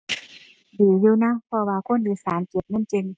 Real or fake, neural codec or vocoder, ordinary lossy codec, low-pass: real; none; none; none